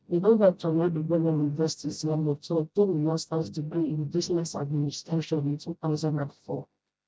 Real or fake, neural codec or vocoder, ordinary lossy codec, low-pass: fake; codec, 16 kHz, 0.5 kbps, FreqCodec, smaller model; none; none